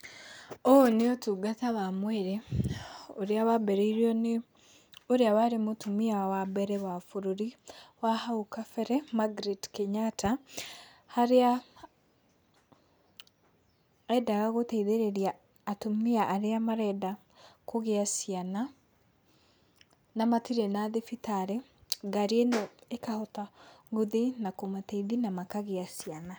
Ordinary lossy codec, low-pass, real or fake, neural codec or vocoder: none; none; real; none